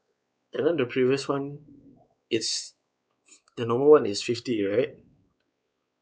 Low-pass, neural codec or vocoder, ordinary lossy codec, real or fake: none; codec, 16 kHz, 4 kbps, X-Codec, WavLM features, trained on Multilingual LibriSpeech; none; fake